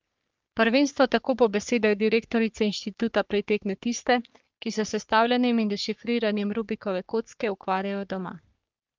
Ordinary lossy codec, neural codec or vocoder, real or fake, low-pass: Opus, 24 kbps; codec, 44.1 kHz, 3.4 kbps, Pupu-Codec; fake; 7.2 kHz